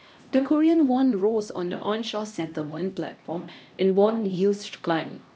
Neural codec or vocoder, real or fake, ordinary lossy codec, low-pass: codec, 16 kHz, 1 kbps, X-Codec, HuBERT features, trained on LibriSpeech; fake; none; none